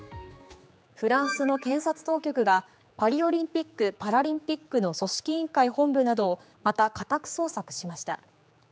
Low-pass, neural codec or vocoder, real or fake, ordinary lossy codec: none; codec, 16 kHz, 4 kbps, X-Codec, HuBERT features, trained on general audio; fake; none